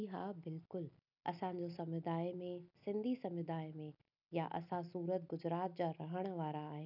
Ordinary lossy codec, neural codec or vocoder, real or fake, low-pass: none; none; real; 5.4 kHz